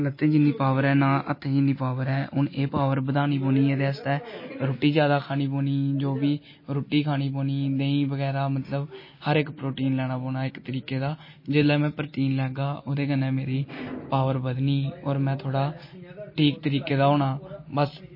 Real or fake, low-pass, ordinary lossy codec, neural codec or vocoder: real; 5.4 kHz; MP3, 24 kbps; none